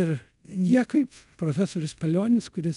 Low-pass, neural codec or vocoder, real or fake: 10.8 kHz; codec, 24 kHz, 0.5 kbps, DualCodec; fake